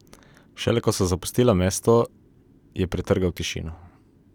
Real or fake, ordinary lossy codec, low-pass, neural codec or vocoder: fake; none; 19.8 kHz; vocoder, 44.1 kHz, 128 mel bands every 256 samples, BigVGAN v2